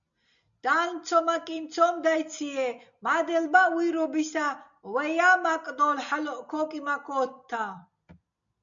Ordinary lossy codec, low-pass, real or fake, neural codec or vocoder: MP3, 96 kbps; 7.2 kHz; real; none